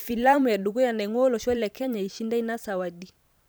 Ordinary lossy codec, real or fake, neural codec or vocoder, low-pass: none; fake; vocoder, 44.1 kHz, 128 mel bands every 512 samples, BigVGAN v2; none